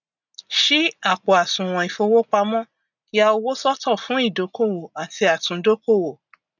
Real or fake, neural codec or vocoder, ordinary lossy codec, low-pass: real; none; none; 7.2 kHz